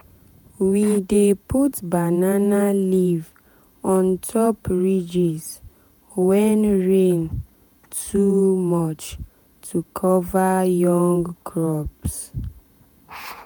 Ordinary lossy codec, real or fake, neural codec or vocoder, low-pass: none; fake; vocoder, 48 kHz, 128 mel bands, Vocos; none